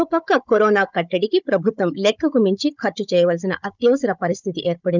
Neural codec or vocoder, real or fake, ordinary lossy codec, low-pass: codec, 16 kHz, 16 kbps, FunCodec, trained on Chinese and English, 50 frames a second; fake; none; 7.2 kHz